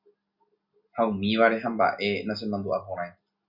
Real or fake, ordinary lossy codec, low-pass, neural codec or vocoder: real; Opus, 64 kbps; 5.4 kHz; none